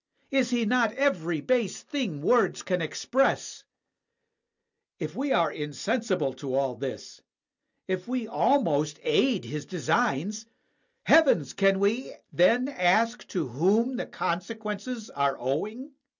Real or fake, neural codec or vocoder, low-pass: real; none; 7.2 kHz